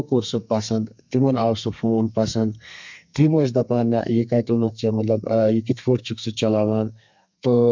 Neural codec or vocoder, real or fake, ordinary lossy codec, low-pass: codec, 32 kHz, 1.9 kbps, SNAC; fake; MP3, 64 kbps; 7.2 kHz